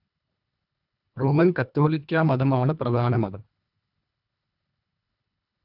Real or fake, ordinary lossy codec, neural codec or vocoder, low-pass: fake; none; codec, 24 kHz, 1.5 kbps, HILCodec; 5.4 kHz